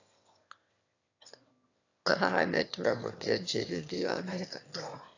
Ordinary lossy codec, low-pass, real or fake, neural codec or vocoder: AAC, 48 kbps; 7.2 kHz; fake; autoencoder, 22.05 kHz, a latent of 192 numbers a frame, VITS, trained on one speaker